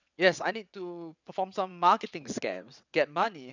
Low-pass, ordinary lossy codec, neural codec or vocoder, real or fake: 7.2 kHz; none; vocoder, 22.05 kHz, 80 mel bands, WaveNeXt; fake